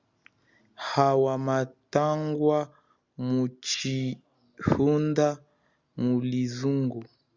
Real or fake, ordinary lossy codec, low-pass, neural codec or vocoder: real; Opus, 64 kbps; 7.2 kHz; none